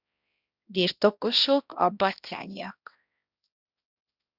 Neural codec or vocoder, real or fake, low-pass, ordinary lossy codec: codec, 16 kHz, 1 kbps, X-Codec, HuBERT features, trained on balanced general audio; fake; 5.4 kHz; Opus, 64 kbps